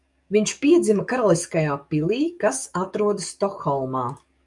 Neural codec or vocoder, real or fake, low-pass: codec, 44.1 kHz, 7.8 kbps, DAC; fake; 10.8 kHz